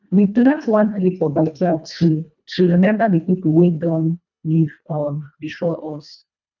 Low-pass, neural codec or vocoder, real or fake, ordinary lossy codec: 7.2 kHz; codec, 24 kHz, 1.5 kbps, HILCodec; fake; none